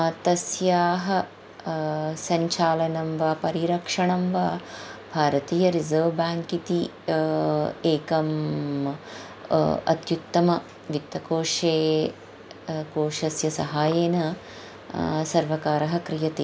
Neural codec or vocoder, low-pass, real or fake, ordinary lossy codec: none; none; real; none